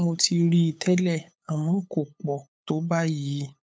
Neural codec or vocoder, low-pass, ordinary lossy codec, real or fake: codec, 16 kHz, 8 kbps, FunCodec, trained on LibriTTS, 25 frames a second; none; none; fake